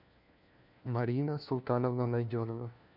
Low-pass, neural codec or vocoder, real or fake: 5.4 kHz; codec, 16 kHz, 1 kbps, FunCodec, trained on LibriTTS, 50 frames a second; fake